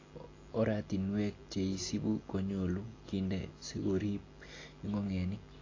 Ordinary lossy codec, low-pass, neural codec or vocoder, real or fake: AAC, 32 kbps; 7.2 kHz; none; real